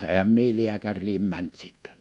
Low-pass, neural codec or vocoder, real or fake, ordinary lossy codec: 10.8 kHz; codec, 24 kHz, 1.2 kbps, DualCodec; fake; none